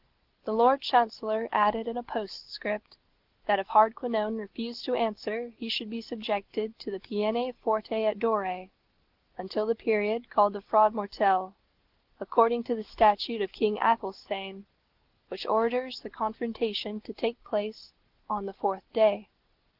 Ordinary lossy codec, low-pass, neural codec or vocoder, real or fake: Opus, 16 kbps; 5.4 kHz; none; real